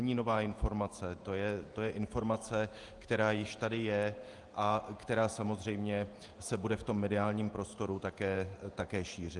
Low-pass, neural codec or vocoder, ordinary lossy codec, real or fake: 10.8 kHz; vocoder, 48 kHz, 128 mel bands, Vocos; Opus, 32 kbps; fake